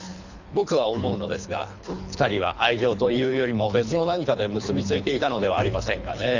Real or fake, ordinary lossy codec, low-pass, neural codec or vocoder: fake; none; 7.2 kHz; codec, 24 kHz, 3 kbps, HILCodec